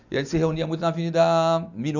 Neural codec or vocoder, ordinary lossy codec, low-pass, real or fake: none; none; 7.2 kHz; real